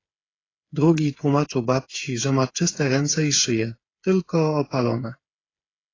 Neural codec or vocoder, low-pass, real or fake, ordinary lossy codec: codec, 16 kHz, 8 kbps, FreqCodec, smaller model; 7.2 kHz; fake; AAC, 32 kbps